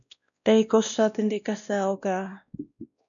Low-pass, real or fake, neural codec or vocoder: 7.2 kHz; fake; codec, 16 kHz, 1 kbps, X-Codec, WavLM features, trained on Multilingual LibriSpeech